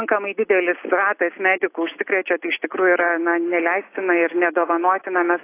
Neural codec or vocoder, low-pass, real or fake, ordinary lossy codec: none; 3.6 kHz; real; AAC, 24 kbps